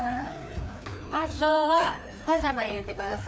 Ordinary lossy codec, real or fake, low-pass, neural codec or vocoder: none; fake; none; codec, 16 kHz, 2 kbps, FreqCodec, larger model